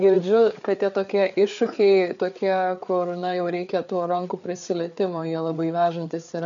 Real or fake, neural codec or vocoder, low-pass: fake; codec, 16 kHz, 4 kbps, FreqCodec, larger model; 7.2 kHz